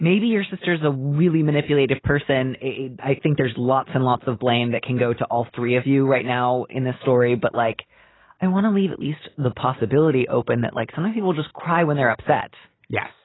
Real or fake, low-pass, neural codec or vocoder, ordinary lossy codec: real; 7.2 kHz; none; AAC, 16 kbps